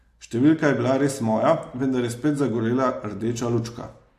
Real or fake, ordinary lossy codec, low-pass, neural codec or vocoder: real; AAC, 64 kbps; 14.4 kHz; none